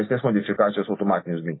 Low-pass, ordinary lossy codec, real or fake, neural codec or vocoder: 7.2 kHz; AAC, 16 kbps; real; none